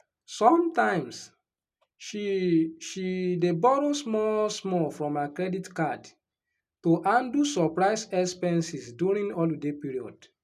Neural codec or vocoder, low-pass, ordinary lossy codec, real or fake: none; 14.4 kHz; none; real